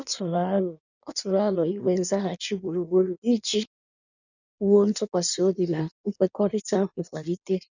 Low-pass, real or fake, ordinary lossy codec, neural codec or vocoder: 7.2 kHz; fake; none; codec, 16 kHz in and 24 kHz out, 1.1 kbps, FireRedTTS-2 codec